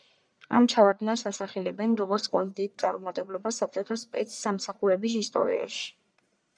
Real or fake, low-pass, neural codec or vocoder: fake; 9.9 kHz; codec, 44.1 kHz, 1.7 kbps, Pupu-Codec